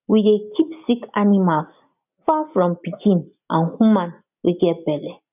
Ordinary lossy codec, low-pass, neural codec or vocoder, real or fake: none; 3.6 kHz; none; real